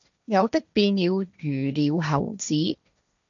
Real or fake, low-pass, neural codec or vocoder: fake; 7.2 kHz; codec, 16 kHz, 1.1 kbps, Voila-Tokenizer